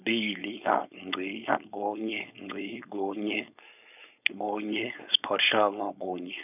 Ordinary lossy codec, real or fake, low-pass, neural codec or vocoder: none; fake; 3.6 kHz; codec, 16 kHz, 4.8 kbps, FACodec